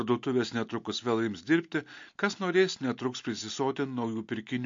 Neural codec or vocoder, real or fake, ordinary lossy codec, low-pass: none; real; MP3, 48 kbps; 7.2 kHz